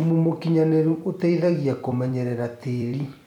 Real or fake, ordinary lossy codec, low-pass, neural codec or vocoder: fake; MP3, 96 kbps; 19.8 kHz; vocoder, 44.1 kHz, 128 mel bands every 256 samples, BigVGAN v2